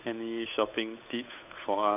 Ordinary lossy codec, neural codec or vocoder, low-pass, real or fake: none; codec, 16 kHz, 8 kbps, FunCodec, trained on Chinese and English, 25 frames a second; 3.6 kHz; fake